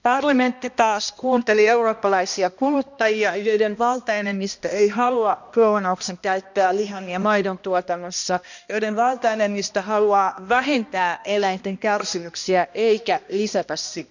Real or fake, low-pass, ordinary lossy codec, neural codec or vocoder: fake; 7.2 kHz; none; codec, 16 kHz, 1 kbps, X-Codec, HuBERT features, trained on balanced general audio